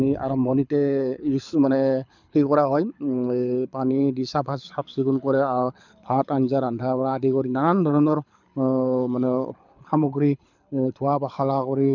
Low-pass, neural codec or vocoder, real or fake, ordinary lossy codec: 7.2 kHz; codec, 24 kHz, 6 kbps, HILCodec; fake; none